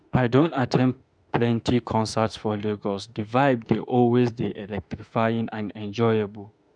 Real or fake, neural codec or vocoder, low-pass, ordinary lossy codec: fake; autoencoder, 48 kHz, 32 numbers a frame, DAC-VAE, trained on Japanese speech; 9.9 kHz; none